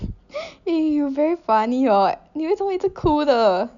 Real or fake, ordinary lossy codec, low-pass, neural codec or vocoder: real; none; 7.2 kHz; none